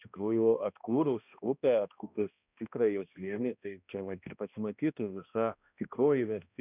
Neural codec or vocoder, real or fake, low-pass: codec, 16 kHz, 1 kbps, X-Codec, HuBERT features, trained on balanced general audio; fake; 3.6 kHz